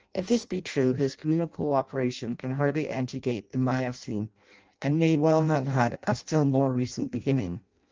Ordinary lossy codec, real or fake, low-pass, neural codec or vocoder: Opus, 24 kbps; fake; 7.2 kHz; codec, 16 kHz in and 24 kHz out, 0.6 kbps, FireRedTTS-2 codec